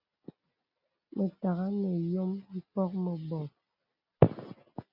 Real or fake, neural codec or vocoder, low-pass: real; none; 5.4 kHz